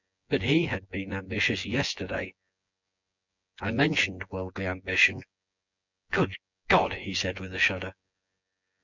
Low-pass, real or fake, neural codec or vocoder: 7.2 kHz; fake; vocoder, 24 kHz, 100 mel bands, Vocos